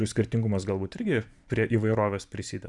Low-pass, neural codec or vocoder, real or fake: 10.8 kHz; vocoder, 44.1 kHz, 128 mel bands every 512 samples, BigVGAN v2; fake